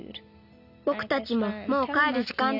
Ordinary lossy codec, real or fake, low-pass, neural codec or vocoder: none; real; 5.4 kHz; none